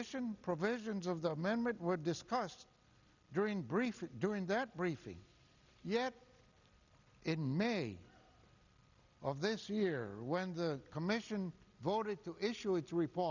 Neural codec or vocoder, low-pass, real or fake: none; 7.2 kHz; real